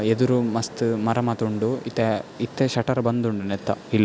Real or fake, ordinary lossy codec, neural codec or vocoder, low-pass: real; none; none; none